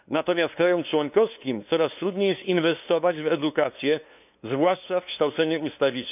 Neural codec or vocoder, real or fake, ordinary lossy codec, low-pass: codec, 16 kHz, 2 kbps, FunCodec, trained on LibriTTS, 25 frames a second; fake; none; 3.6 kHz